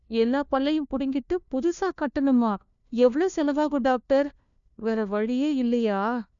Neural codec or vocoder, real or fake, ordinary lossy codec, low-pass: codec, 16 kHz, 1 kbps, FunCodec, trained on LibriTTS, 50 frames a second; fake; none; 7.2 kHz